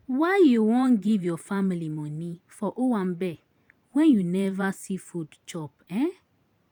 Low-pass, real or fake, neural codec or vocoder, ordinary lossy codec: 19.8 kHz; fake; vocoder, 44.1 kHz, 128 mel bands every 512 samples, BigVGAN v2; none